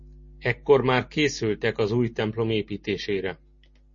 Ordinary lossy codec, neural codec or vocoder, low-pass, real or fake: MP3, 32 kbps; none; 7.2 kHz; real